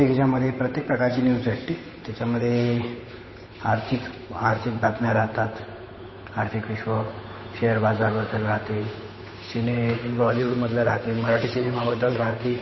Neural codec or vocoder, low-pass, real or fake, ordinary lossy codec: codec, 16 kHz, 8 kbps, FunCodec, trained on Chinese and English, 25 frames a second; 7.2 kHz; fake; MP3, 24 kbps